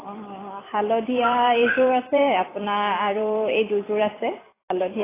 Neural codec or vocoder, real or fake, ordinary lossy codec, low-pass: vocoder, 44.1 kHz, 128 mel bands every 256 samples, BigVGAN v2; fake; AAC, 16 kbps; 3.6 kHz